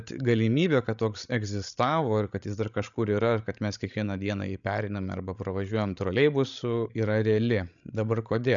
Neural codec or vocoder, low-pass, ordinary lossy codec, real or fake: codec, 16 kHz, 16 kbps, FreqCodec, larger model; 7.2 kHz; MP3, 96 kbps; fake